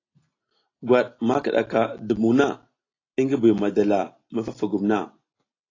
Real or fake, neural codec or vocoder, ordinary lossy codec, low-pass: real; none; AAC, 32 kbps; 7.2 kHz